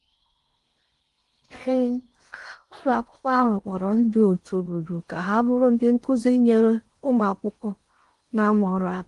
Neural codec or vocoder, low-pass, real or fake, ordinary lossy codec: codec, 16 kHz in and 24 kHz out, 0.6 kbps, FocalCodec, streaming, 4096 codes; 10.8 kHz; fake; Opus, 24 kbps